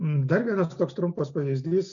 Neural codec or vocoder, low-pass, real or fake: none; 7.2 kHz; real